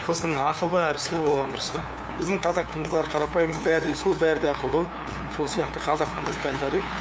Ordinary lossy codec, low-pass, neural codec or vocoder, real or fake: none; none; codec, 16 kHz, 2 kbps, FunCodec, trained on LibriTTS, 25 frames a second; fake